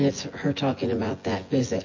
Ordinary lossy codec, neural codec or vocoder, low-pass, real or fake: MP3, 32 kbps; vocoder, 24 kHz, 100 mel bands, Vocos; 7.2 kHz; fake